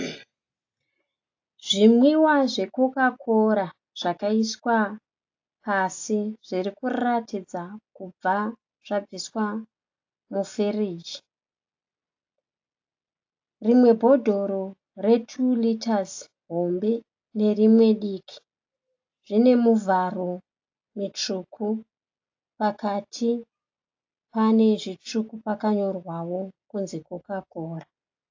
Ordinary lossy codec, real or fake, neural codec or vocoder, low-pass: AAC, 48 kbps; real; none; 7.2 kHz